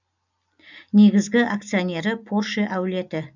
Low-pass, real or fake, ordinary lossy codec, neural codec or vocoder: 7.2 kHz; real; none; none